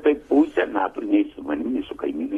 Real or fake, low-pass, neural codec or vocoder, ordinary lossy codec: real; 14.4 kHz; none; AAC, 32 kbps